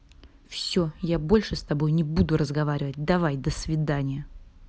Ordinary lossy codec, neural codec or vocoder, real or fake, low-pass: none; none; real; none